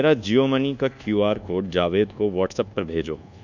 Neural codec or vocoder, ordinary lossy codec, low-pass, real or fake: codec, 24 kHz, 1.2 kbps, DualCodec; none; 7.2 kHz; fake